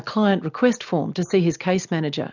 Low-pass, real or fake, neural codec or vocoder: 7.2 kHz; real; none